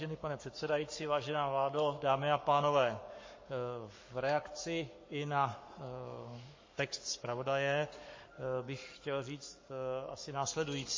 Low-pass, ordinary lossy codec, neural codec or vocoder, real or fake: 7.2 kHz; MP3, 32 kbps; codec, 44.1 kHz, 7.8 kbps, Pupu-Codec; fake